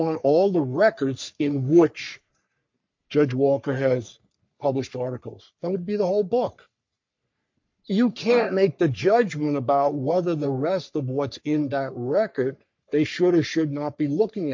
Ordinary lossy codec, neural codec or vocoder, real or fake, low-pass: MP3, 48 kbps; codec, 44.1 kHz, 3.4 kbps, Pupu-Codec; fake; 7.2 kHz